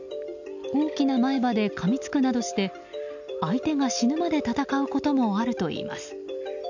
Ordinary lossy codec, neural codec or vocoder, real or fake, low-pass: none; none; real; 7.2 kHz